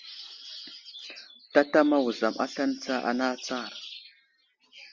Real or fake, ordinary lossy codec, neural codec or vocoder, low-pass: real; Opus, 32 kbps; none; 7.2 kHz